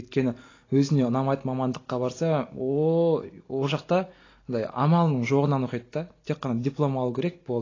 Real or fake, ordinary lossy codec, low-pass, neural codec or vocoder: real; AAC, 32 kbps; 7.2 kHz; none